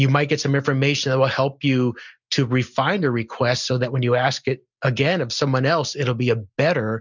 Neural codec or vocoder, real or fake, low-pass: none; real; 7.2 kHz